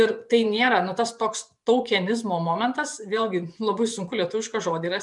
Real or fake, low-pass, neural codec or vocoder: fake; 10.8 kHz; vocoder, 24 kHz, 100 mel bands, Vocos